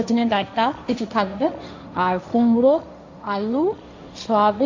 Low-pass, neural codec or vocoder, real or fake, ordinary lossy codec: 7.2 kHz; codec, 16 kHz, 1.1 kbps, Voila-Tokenizer; fake; MP3, 64 kbps